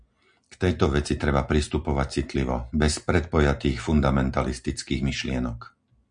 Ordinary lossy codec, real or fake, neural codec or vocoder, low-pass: MP3, 96 kbps; real; none; 9.9 kHz